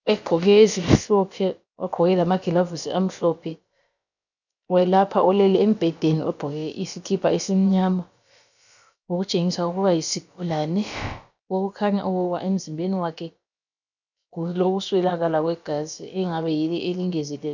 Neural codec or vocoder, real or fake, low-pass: codec, 16 kHz, about 1 kbps, DyCAST, with the encoder's durations; fake; 7.2 kHz